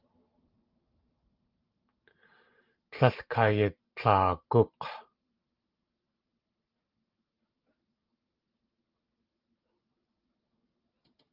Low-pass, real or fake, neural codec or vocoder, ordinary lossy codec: 5.4 kHz; fake; vocoder, 44.1 kHz, 128 mel bands every 512 samples, BigVGAN v2; Opus, 32 kbps